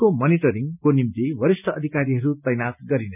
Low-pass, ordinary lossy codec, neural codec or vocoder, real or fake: 3.6 kHz; none; none; real